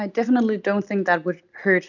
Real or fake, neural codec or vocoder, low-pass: real; none; 7.2 kHz